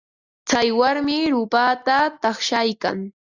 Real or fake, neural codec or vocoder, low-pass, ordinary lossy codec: real; none; 7.2 kHz; Opus, 64 kbps